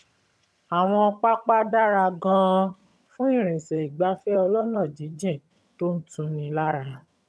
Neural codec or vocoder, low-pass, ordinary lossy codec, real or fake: vocoder, 22.05 kHz, 80 mel bands, HiFi-GAN; none; none; fake